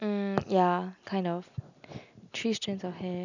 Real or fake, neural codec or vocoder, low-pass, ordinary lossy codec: real; none; 7.2 kHz; none